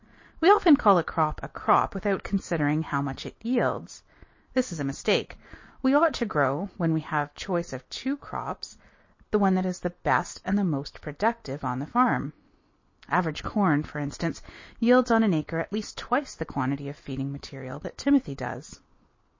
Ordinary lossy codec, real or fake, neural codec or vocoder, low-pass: MP3, 32 kbps; real; none; 7.2 kHz